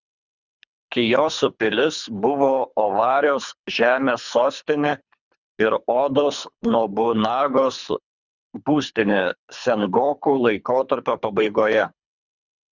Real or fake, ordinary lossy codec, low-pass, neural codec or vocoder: fake; Opus, 64 kbps; 7.2 kHz; codec, 24 kHz, 3 kbps, HILCodec